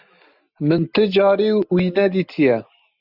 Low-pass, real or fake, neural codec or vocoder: 5.4 kHz; real; none